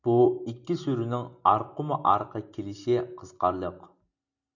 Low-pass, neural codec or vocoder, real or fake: 7.2 kHz; none; real